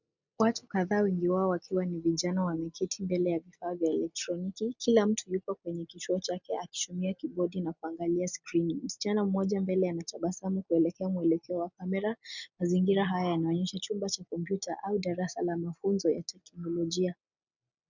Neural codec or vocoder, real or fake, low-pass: none; real; 7.2 kHz